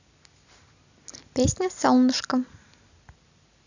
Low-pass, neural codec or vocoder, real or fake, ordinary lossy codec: 7.2 kHz; none; real; none